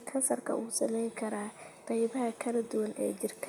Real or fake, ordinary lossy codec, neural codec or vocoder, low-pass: fake; none; vocoder, 44.1 kHz, 128 mel bands, Pupu-Vocoder; none